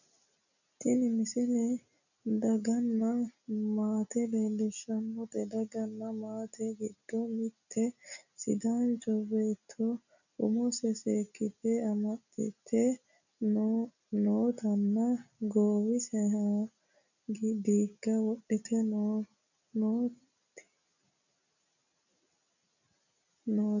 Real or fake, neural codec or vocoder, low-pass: real; none; 7.2 kHz